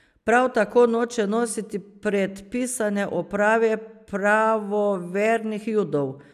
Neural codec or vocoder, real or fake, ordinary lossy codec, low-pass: none; real; none; 14.4 kHz